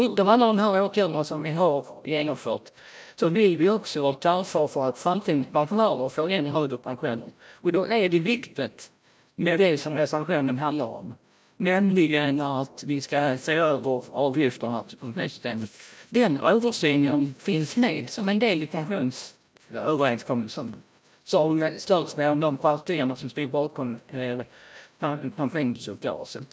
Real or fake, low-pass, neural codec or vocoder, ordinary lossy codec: fake; none; codec, 16 kHz, 0.5 kbps, FreqCodec, larger model; none